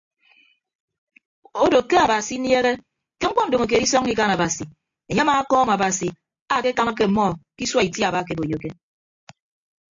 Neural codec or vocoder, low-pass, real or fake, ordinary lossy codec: none; 7.2 kHz; real; AAC, 48 kbps